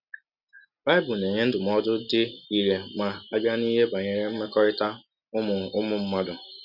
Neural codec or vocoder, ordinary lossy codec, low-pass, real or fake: none; none; 5.4 kHz; real